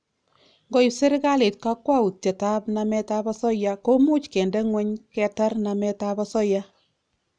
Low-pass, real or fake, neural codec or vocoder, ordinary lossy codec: 9.9 kHz; real; none; none